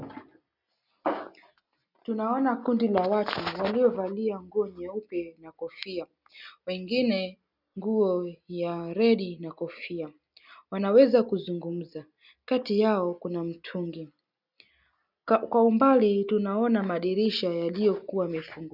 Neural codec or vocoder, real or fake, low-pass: none; real; 5.4 kHz